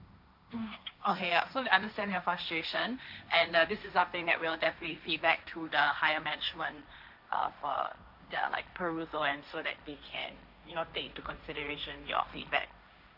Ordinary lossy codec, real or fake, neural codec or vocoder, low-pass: none; fake; codec, 16 kHz, 1.1 kbps, Voila-Tokenizer; 5.4 kHz